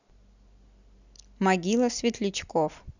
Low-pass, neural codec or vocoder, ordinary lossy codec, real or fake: 7.2 kHz; none; none; real